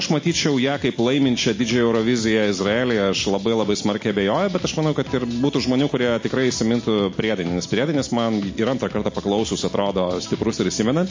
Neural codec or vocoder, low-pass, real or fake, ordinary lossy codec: none; 7.2 kHz; real; MP3, 32 kbps